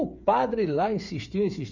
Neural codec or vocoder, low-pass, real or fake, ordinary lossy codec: codec, 16 kHz, 8 kbps, FreqCodec, smaller model; 7.2 kHz; fake; none